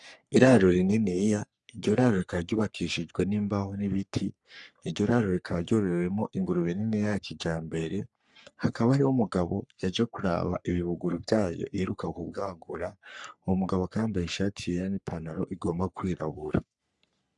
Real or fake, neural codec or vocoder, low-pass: fake; codec, 44.1 kHz, 3.4 kbps, Pupu-Codec; 10.8 kHz